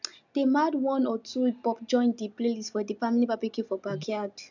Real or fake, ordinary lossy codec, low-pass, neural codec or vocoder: real; none; 7.2 kHz; none